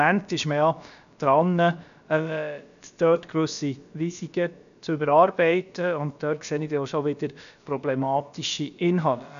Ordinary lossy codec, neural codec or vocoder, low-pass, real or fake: none; codec, 16 kHz, about 1 kbps, DyCAST, with the encoder's durations; 7.2 kHz; fake